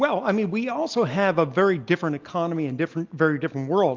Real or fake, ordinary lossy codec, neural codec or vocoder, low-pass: real; Opus, 24 kbps; none; 7.2 kHz